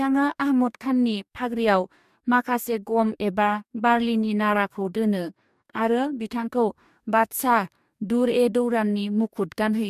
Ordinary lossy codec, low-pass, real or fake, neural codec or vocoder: MP3, 96 kbps; 14.4 kHz; fake; codec, 44.1 kHz, 2.6 kbps, DAC